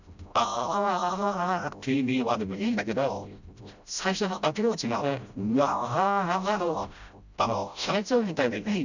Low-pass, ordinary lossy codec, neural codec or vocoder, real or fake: 7.2 kHz; none; codec, 16 kHz, 0.5 kbps, FreqCodec, smaller model; fake